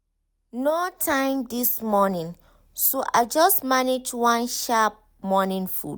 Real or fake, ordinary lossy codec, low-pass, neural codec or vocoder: real; none; none; none